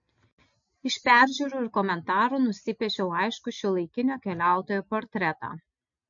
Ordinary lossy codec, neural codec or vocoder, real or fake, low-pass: MP3, 48 kbps; none; real; 7.2 kHz